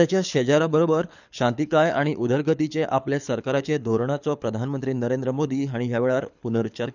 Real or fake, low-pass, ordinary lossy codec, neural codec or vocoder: fake; 7.2 kHz; none; codec, 24 kHz, 6 kbps, HILCodec